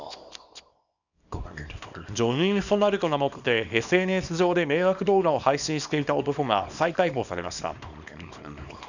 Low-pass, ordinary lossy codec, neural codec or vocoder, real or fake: 7.2 kHz; none; codec, 24 kHz, 0.9 kbps, WavTokenizer, small release; fake